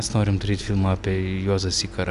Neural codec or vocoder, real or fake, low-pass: none; real; 10.8 kHz